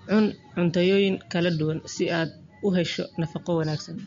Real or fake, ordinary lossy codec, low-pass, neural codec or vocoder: real; MP3, 48 kbps; 7.2 kHz; none